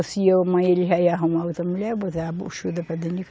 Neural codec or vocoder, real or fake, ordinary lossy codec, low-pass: none; real; none; none